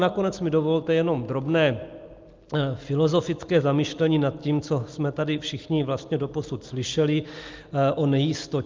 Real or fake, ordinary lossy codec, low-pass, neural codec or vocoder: real; Opus, 32 kbps; 7.2 kHz; none